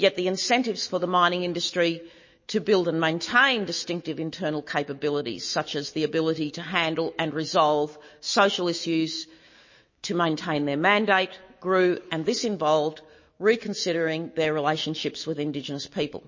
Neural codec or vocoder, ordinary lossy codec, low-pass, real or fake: autoencoder, 48 kHz, 128 numbers a frame, DAC-VAE, trained on Japanese speech; MP3, 32 kbps; 7.2 kHz; fake